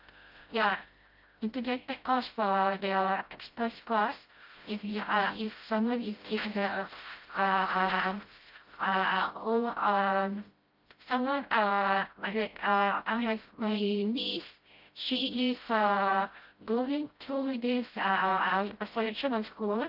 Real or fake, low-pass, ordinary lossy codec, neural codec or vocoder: fake; 5.4 kHz; Opus, 24 kbps; codec, 16 kHz, 0.5 kbps, FreqCodec, smaller model